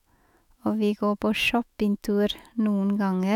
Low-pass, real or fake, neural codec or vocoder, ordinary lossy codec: 19.8 kHz; fake; autoencoder, 48 kHz, 128 numbers a frame, DAC-VAE, trained on Japanese speech; none